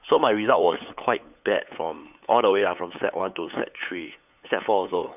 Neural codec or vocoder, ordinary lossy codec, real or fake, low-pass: codec, 16 kHz, 8 kbps, FunCodec, trained on LibriTTS, 25 frames a second; none; fake; 3.6 kHz